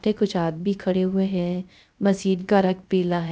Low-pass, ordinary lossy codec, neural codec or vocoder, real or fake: none; none; codec, 16 kHz, 0.3 kbps, FocalCodec; fake